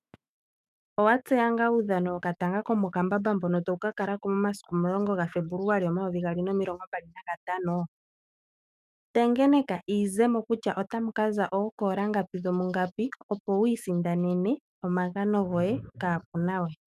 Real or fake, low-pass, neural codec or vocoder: fake; 14.4 kHz; autoencoder, 48 kHz, 128 numbers a frame, DAC-VAE, trained on Japanese speech